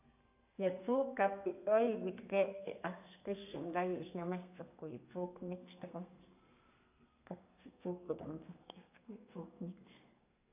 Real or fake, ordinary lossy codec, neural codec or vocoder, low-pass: fake; none; codec, 32 kHz, 1.9 kbps, SNAC; 3.6 kHz